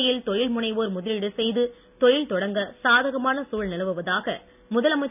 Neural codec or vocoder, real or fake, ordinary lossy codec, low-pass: none; real; none; 3.6 kHz